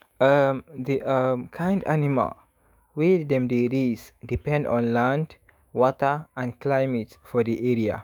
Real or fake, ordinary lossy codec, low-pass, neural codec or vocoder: fake; none; 19.8 kHz; autoencoder, 48 kHz, 128 numbers a frame, DAC-VAE, trained on Japanese speech